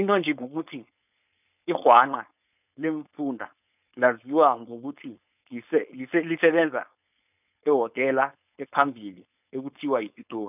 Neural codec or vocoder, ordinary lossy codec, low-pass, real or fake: codec, 16 kHz, 4.8 kbps, FACodec; none; 3.6 kHz; fake